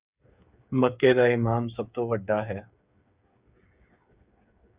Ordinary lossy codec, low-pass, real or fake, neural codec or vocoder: Opus, 64 kbps; 3.6 kHz; fake; codec, 16 kHz, 8 kbps, FreqCodec, smaller model